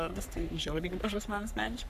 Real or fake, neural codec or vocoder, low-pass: fake; codec, 44.1 kHz, 3.4 kbps, Pupu-Codec; 14.4 kHz